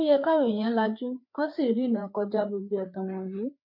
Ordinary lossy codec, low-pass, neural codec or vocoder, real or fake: MP3, 32 kbps; 5.4 kHz; codec, 16 kHz, 4 kbps, FreqCodec, larger model; fake